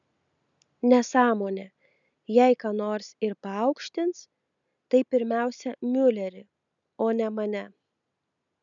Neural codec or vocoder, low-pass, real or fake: none; 7.2 kHz; real